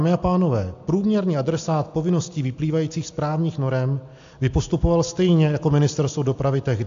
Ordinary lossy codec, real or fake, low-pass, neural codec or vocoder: AAC, 64 kbps; real; 7.2 kHz; none